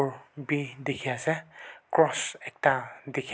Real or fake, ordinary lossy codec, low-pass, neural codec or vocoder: real; none; none; none